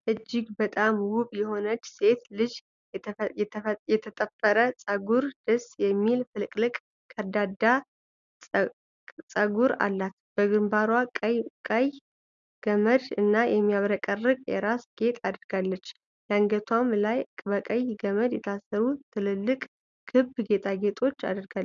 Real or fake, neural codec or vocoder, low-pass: real; none; 7.2 kHz